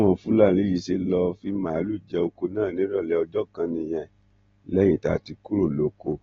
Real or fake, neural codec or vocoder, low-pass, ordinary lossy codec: real; none; 7.2 kHz; AAC, 24 kbps